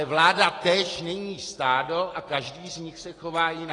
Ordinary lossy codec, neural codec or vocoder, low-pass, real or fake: AAC, 32 kbps; none; 10.8 kHz; real